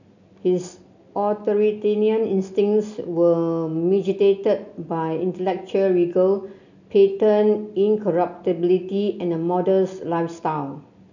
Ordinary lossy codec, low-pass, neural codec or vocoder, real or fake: none; 7.2 kHz; none; real